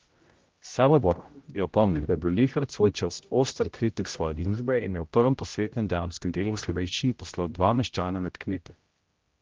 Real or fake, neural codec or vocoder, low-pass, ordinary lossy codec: fake; codec, 16 kHz, 0.5 kbps, X-Codec, HuBERT features, trained on general audio; 7.2 kHz; Opus, 32 kbps